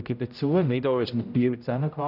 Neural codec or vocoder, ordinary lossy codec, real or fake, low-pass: codec, 16 kHz, 0.5 kbps, X-Codec, HuBERT features, trained on general audio; none; fake; 5.4 kHz